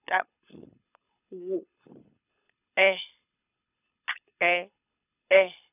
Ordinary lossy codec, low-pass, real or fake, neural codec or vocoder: none; 3.6 kHz; fake; codec, 24 kHz, 6 kbps, HILCodec